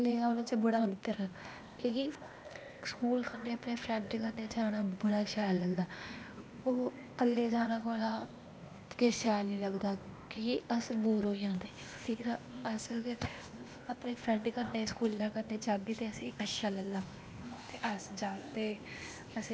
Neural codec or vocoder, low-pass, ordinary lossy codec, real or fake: codec, 16 kHz, 0.8 kbps, ZipCodec; none; none; fake